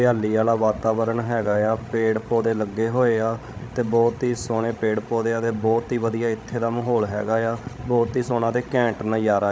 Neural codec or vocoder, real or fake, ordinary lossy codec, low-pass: codec, 16 kHz, 16 kbps, FreqCodec, larger model; fake; none; none